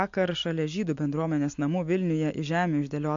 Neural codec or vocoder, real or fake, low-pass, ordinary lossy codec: none; real; 7.2 kHz; MP3, 48 kbps